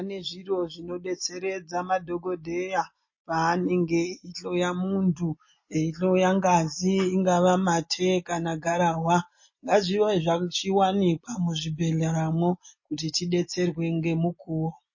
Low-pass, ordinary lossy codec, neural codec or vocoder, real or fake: 7.2 kHz; MP3, 32 kbps; none; real